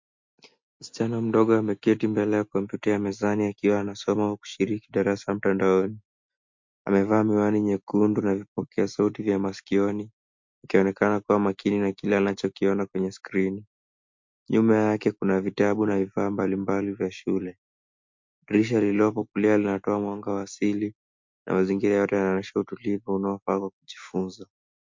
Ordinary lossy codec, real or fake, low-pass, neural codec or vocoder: MP3, 48 kbps; real; 7.2 kHz; none